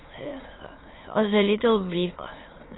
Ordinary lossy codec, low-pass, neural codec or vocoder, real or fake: AAC, 16 kbps; 7.2 kHz; autoencoder, 22.05 kHz, a latent of 192 numbers a frame, VITS, trained on many speakers; fake